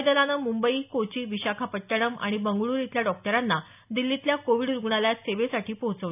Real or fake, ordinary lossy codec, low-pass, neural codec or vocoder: real; none; 3.6 kHz; none